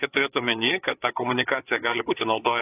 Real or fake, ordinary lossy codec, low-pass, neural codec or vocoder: fake; AAC, 24 kbps; 3.6 kHz; codec, 16 kHz, 4 kbps, FreqCodec, larger model